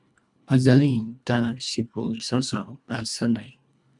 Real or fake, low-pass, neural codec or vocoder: fake; 10.8 kHz; codec, 24 kHz, 1.5 kbps, HILCodec